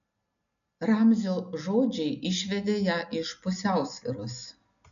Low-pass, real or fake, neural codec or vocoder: 7.2 kHz; real; none